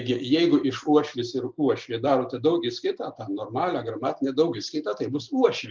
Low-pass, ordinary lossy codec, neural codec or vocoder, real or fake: 7.2 kHz; Opus, 32 kbps; none; real